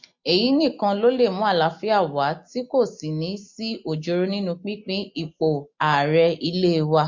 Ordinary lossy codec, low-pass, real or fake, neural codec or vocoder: MP3, 48 kbps; 7.2 kHz; real; none